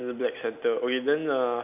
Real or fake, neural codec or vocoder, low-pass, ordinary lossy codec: real; none; 3.6 kHz; none